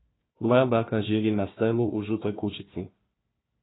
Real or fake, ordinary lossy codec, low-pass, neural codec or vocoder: fake; AAC, 16 kbps; 7.2 kHz; codec, 16 kHz, 1 kbps, FunCodec, trained on Chinese and English, 50 frames a second